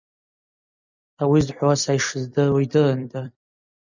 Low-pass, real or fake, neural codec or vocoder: 7.2 kHz; fake; vocoder, 44.1 kHz, 128 mel bands every 256 samples, BigVGAN v2